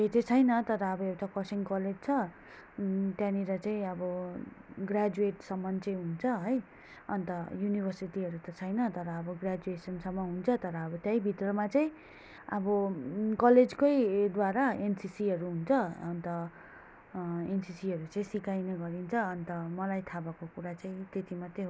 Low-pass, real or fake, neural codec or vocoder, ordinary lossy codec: none; real; none; none